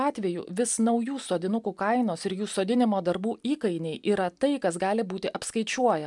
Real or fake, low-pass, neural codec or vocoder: real; 10.8 kHz; none